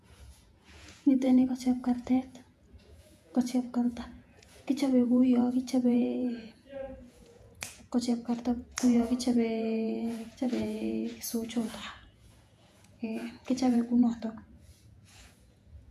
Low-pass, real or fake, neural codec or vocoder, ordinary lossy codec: 14.4 kHz; fake; vocoder, 48 kHz, 128 mel bands, Vocos; none